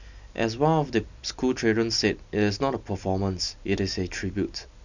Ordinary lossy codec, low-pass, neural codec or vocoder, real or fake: none; 7.2 kHz; none; real